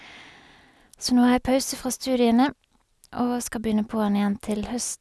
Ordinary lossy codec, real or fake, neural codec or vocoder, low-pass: none; real; none; none